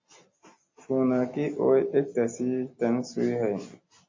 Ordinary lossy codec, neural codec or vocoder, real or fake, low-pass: MP3, 32 kbps; none; real; 7.2 kHz